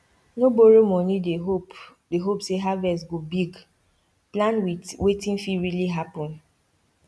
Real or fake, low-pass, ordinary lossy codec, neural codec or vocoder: real; none; none; none